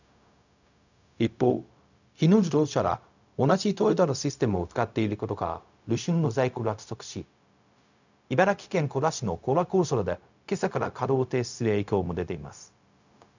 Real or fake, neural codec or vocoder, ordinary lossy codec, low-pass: fake; codec, 16 kHz, 0.4 kbps, LongCat-Audio-Codec; none; 7.2 kHz